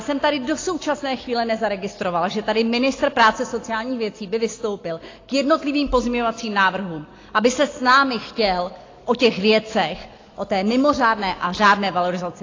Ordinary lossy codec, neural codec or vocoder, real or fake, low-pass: AAC, 32 kbps; none; real; 7.2 kHz